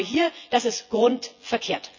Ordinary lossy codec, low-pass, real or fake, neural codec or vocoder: none; 7.2 kHz; fake; vocoder, 24 kHz, 100 mel bands, Vocos